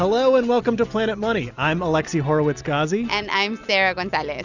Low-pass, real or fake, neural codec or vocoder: 7.2 kHz; real; none